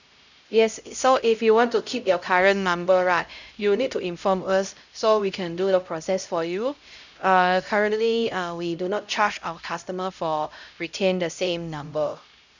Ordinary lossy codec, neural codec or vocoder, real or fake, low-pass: none; codec, 16 kHz, 0.5 kbps, X-Codec, HuBERT features, trained on LibriSpeech; fake; 7.2 kHz